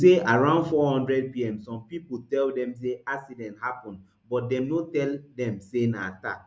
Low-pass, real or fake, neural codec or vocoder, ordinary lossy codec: none; real; none; none